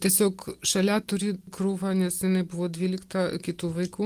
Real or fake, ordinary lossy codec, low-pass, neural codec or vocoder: real; Opus, 24 kbps; 14.4 kHz; none